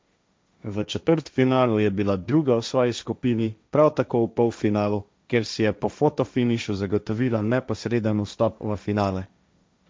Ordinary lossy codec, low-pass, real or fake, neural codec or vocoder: none; none; fake; codec, 16 kHz, 1.1 kbps, Voila-Tokenizer